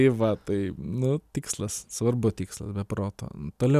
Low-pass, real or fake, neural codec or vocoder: 14.4 kHz; real; none